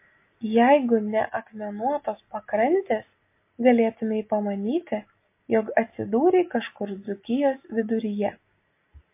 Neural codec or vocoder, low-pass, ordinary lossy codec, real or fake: none; 3.6 kHz; MP3, 24 kbps; real